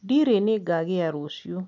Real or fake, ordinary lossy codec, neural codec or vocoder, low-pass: real; none; none; 7.2 kHz